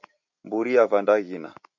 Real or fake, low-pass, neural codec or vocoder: fake; 7.2 kHz; vocoder, 44.1 kHz, 128 mel bands every 256 samples, BigVGAN v2